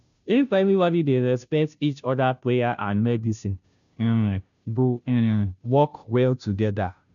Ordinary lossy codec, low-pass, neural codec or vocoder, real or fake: none; 7.2 kHz; codec, 16 kHz, 0.5 kbps, FunCodec, trained on Chinese and English, 25 frames a second; fake